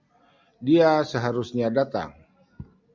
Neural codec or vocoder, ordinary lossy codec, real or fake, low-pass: none; MP3, 64 kbps; real; 7.2 kHz